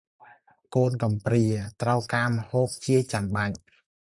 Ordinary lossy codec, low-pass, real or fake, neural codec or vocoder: MP3, 96 kbps; 10.8 kHz; fake; vocoder, 44.1 kHz, 128 mel bands, Pupu-Vocoder